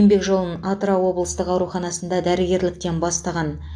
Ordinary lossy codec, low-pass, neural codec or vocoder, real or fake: AAC, 64 kbps; 9.9 kHz; none; real